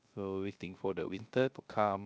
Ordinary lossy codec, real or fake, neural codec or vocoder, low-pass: none; fake; codec, 16 kHz, 0.3 kbps, FocalCodec; none